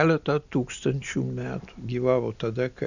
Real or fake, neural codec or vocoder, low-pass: real; none; 7.2 kHz